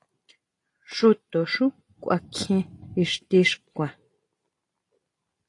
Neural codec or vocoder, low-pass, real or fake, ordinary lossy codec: none; 10.8 kHz; real; AAC, 48 kbps